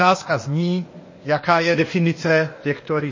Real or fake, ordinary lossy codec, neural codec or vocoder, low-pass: fake; MP3, 32 kbps; codec, 16 kHz, 0.8 kbps, ZipCodec; 7.2 kHz